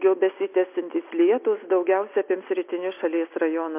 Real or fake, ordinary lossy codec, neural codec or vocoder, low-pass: real; MP3, 32 kbps; none; 3.6 kHz